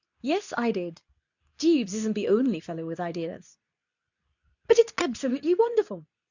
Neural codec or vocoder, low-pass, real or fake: codec, 24 kHz, 0.9 kbps, WavTokenizer, medium speech release version 2; 7.2 kHz; fake